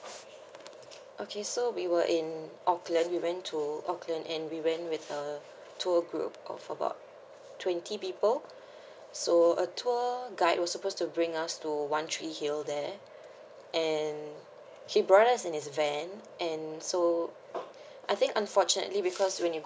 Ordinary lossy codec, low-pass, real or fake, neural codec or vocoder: none; none; real; none